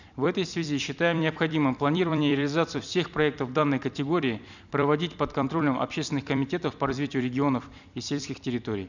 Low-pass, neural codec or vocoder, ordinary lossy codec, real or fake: 7.2 kHz; vocoder, 44.1 kHz, 128 mel bands every 256 samples, BigVGAN v2; none; fake